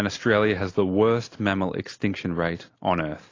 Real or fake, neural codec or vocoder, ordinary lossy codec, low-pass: real; none; AAC, 32 kbps; 7.2 kHz